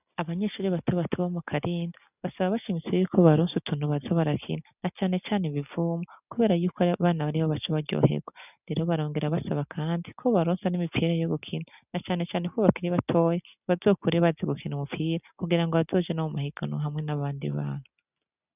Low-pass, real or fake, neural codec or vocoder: 3.6 kHz; real; none